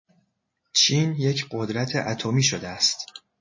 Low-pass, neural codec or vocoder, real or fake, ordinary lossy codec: 7.2 kHz; none; real; MP3, 32 kbps